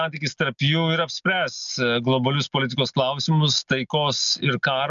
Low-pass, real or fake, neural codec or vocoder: 7.2 kHz; real; none